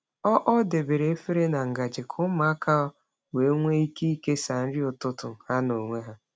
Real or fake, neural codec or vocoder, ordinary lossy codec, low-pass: real; none; none; none